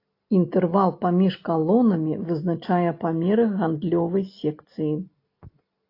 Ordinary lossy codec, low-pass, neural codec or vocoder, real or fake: AAC, 32 kbps; 5.4 kHz; none; real